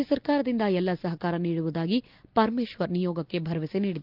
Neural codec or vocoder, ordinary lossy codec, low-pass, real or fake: none; Opus, 24 kbps; 5.4 kHz; real